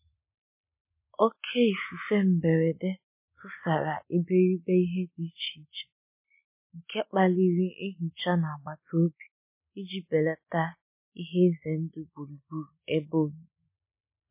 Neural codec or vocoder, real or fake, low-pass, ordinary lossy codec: none; real; 3.6 kHz; MP3, 24 kbps